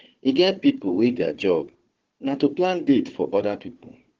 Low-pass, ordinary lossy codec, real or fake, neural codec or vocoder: 7.2 kHz; Opus, 16 kbps; fake; codec, 16 kHz, 4 kbps, FunCodec, trained on Chinese and English, 50 frames a second